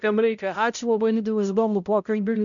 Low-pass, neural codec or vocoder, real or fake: 7.2 kHz; codec, 16 kHz, 0.5 kbps, X-Codec, HuBERT features, trained on balanced general audio; fake